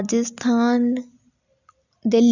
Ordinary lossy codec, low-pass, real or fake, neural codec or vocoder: none; 7.2 kHz; real; none